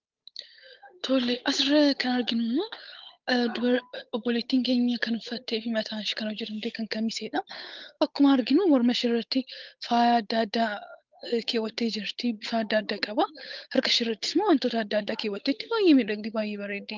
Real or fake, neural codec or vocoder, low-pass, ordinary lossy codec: fake; codec, 16 kHz, 8 kbps, FunCodec, trained on Chinese and English, 25 frames a second; 7.2 kHz; Opus, 32 kbps